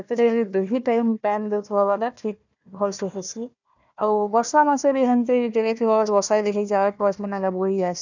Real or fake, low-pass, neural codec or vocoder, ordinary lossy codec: fake; 7.2 kHz; codec, 16 kHz, 1 kbps, FunCodec, trained on Chinese and English, 50 frames a second; none